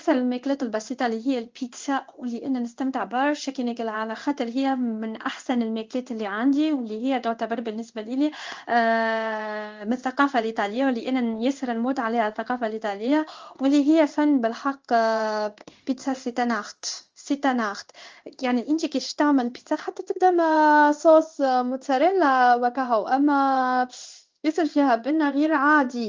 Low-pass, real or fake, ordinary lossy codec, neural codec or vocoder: 7.2 kHz; fake; Opus, 32 kbps; codec, 16 kHz in and 24 kHz out, 1 kbps, XY-Tokenizer